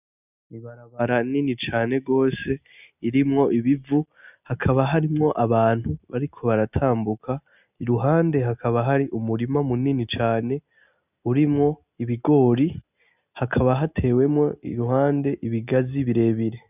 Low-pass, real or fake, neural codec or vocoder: 3.6 kHz; real; none